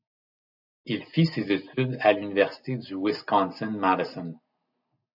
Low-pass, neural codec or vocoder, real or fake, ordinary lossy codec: 5.4 kHz; none; real; AAC, 48 kbps